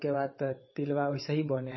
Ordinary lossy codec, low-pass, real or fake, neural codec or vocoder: MP3, 24 kbps; 7.2 kHz; fake; vocoder, 22.05 kHz, 80 mel bands, WaveNeXt